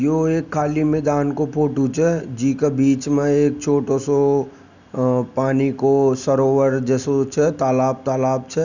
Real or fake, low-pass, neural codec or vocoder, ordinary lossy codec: real; 7.2 kHz; none; none